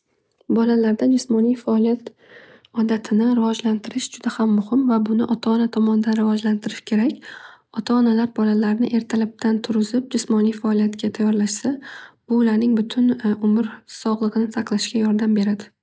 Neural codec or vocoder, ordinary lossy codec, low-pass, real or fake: none; none; none; real